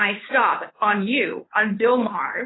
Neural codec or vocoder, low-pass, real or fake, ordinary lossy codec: codec, 16 kHz, 2 kbps, FunCodec, trained on Chinese and English, 25 frames a second; 7.2 kHz; fake; AAC, 16 kbps